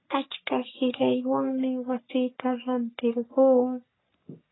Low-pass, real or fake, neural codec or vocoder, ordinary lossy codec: 7.2 kHz; fake; codec, 44.1 kHz, 3.4 kbps, Pupu-Codec; AAC, 16 kbps